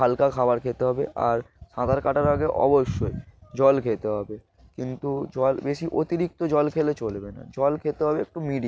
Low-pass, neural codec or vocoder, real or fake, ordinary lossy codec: none; none; real; none